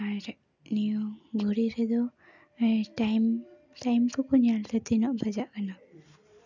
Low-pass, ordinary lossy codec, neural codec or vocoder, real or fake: 7.2 kHz; none; none; real